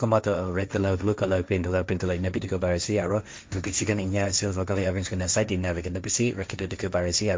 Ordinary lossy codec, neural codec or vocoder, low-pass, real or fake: none; codec, 16 kHz, 1.1 kbps, Voila-Tokenizer; none; fake